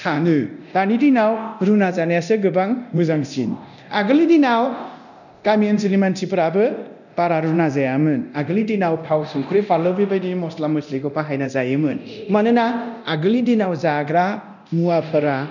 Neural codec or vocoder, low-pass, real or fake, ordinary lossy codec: codec, 24 kHz, 0.9 kbps, DualCodec; 7.2 kHz; fake; none